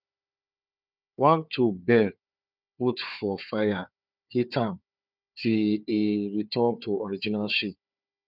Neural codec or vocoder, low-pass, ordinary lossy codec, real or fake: codec, 16 kHz, 4 kbps, FunCodec, trained on Chinese and English, 50 frames a second; 5.4 kHz; none; fake